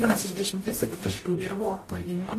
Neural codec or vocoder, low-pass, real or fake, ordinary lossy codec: codec, 44.1 kHz, 0.9 kbps, DAC; 14.4 kHz; fake; AAC, 48 kbps